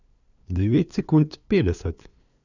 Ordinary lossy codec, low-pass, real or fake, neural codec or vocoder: none; 7.2 kHz; fake; codec, 16 kHz, 2 kbps, FunCodec, trained on LibriTTS, 25 frames a second